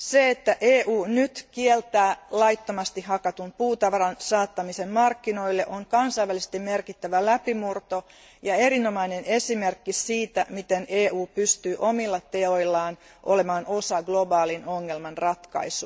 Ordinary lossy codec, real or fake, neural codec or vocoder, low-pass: none; real; none; none